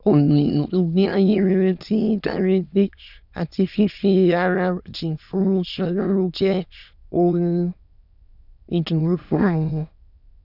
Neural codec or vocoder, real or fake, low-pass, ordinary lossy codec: autoencoder, 22.05 kHz, a latent of 192 numbers a frame, VITS, trained on many speakers; fake; 5.4 kHz; none